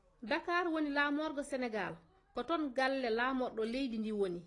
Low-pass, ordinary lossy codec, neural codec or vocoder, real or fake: 10.8 kHz; AAC, 32 kbps; none; real